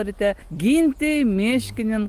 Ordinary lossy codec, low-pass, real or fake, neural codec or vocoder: Opus, 24 kbps; 14.4 kHz; real; none